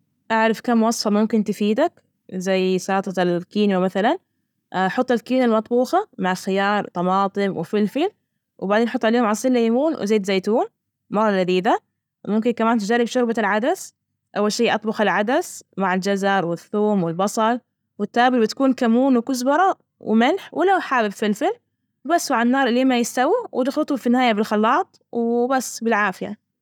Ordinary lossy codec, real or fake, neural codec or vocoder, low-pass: none; real; none; 19.8 kHz